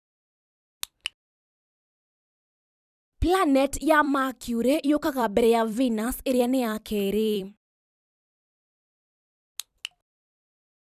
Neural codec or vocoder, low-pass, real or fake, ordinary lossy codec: vocoder, 44.1 kHz, 128 mel bands every 256 samples, BigVGAN v2; 14.4 kHz; fake; none